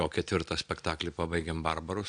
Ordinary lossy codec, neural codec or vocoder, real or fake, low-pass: AAC, 64 kbps; none; real; 9.9 kHz